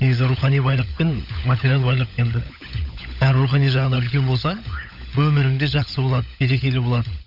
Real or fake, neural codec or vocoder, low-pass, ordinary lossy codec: fake; codec, 16 kHz, 16 kbps, FunCodec, trained on LibriTTS, 50 frames a second; 5.4 kHz; none